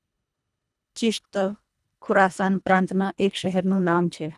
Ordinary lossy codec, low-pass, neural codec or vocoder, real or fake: none; none; codec, 24 kHz, 1.5 kbps, HILCodec; fake